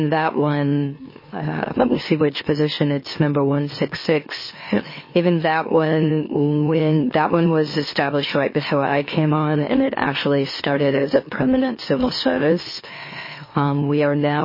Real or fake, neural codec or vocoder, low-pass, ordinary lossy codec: fake; autoencoder, 44.1 kHz, a latent of 192 numbers a frame, MeloTTS; 5.4 kHz; MP3, 24 kbps